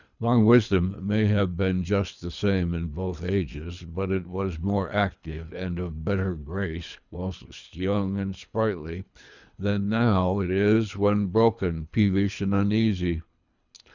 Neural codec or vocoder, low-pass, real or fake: codec, 24 kHz, 3 kbps, HILCodec; 7.2 kHz; fake